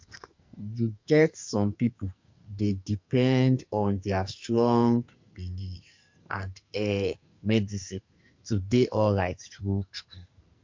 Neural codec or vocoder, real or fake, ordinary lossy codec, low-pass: codec, 44.1 kHz, 2.6 kbps, SNAC; fake; MP3, 48 kbps; 7.2 kHz